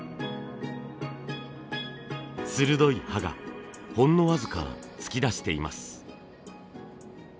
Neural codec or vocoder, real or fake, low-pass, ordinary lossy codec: none; real; none; none